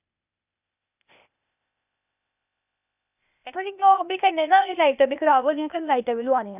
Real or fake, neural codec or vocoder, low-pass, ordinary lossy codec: fake; codec, 16 kHz, 0.8 kbps, ZipCodec; 3.6 kHz; none